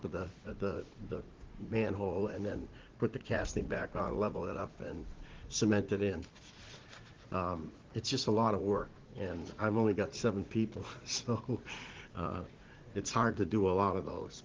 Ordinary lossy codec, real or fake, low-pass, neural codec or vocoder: Opus, 16 kbps; fake; 7.2 kHz; vocoder, 44.1 kHz, 80 mel bands, Vocos